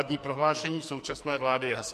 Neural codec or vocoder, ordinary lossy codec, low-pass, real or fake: codec, 44.1 kHz, 2.6 kbps, SNAC; MP3, 64 kbps; 14.4 kHz; fake